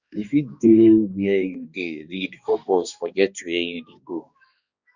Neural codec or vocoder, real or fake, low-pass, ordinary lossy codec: codec, 16 kHz, 2 kbps, X-Codec, HuBERT features, trained on balanced general audio; fake; 7.2 kHz; Opus, 64 kbps